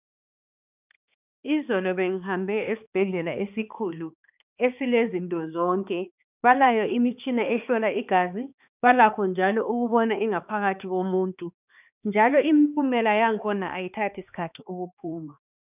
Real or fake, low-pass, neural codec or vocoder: fake; 3.6 kHz; codec, 16 kHz, 2 kbps, X-Codec, HuBERT features, trained on balanced general audio